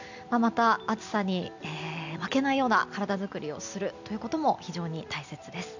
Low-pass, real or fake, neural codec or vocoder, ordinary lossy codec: 7.2 kHz; real; none; none